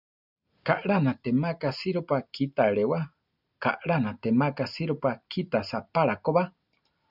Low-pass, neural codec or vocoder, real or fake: 5.4 kHz; none; real